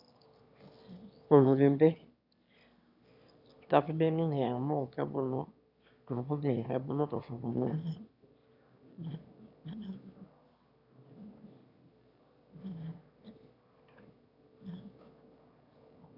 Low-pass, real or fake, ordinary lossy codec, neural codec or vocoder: 5.4 kHz; fake; none; autoencoder, 22.05 kHz, a latent of 192 numbers a frame, VITS, trained on one speaker